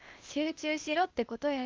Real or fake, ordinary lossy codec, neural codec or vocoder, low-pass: fake; Opus, 24 kbps; codec, 16 kHz, 0.8 kbps, ZipCodec; 7.2 kHz